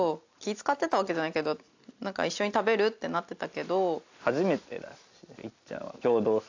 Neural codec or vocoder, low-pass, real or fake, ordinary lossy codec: none; 7.2 kHz; real; AAC, 48 kbps